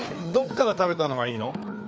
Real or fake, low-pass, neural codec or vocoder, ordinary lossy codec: fake; none; codec, 16 kHz, 4 kbps, FreqCodec, larger model; none